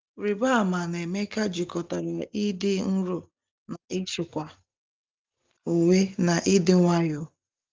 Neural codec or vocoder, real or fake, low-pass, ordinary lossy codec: none; real; 7.2 kHz; Opus, 32 kbps